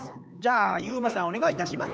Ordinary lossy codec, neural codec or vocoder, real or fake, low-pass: none; codec, 16 kHz, 4 kbps, X-Codec, HuBERT features, trained on LibriSpeech; fake; none